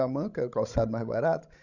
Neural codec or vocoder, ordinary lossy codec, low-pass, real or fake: codec, 16 kHz, 16 kbps, FreqCodec, larger model; none; 7.2 kHz; fake